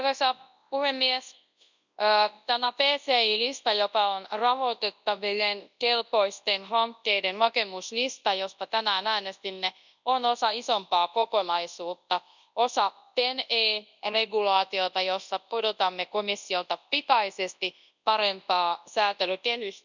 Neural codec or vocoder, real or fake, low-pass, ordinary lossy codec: codec, 24 kHz, 0.9 kbps, WavTokenizer, large speech release; fake; 7.2 kHz; none